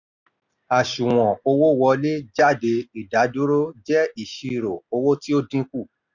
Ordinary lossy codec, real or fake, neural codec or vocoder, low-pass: none; real; none; 7.2 kHz